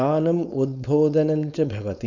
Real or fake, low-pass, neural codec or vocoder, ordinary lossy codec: fake; 7.2 kHz; codec, 16 kHz, 4.8 kbps, FACodec; none